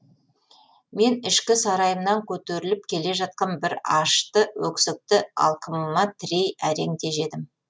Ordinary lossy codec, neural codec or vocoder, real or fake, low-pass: none; none; real; none